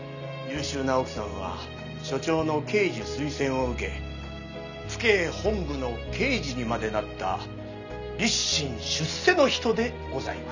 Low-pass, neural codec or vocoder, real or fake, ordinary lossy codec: 7.2 kHz; none; real; none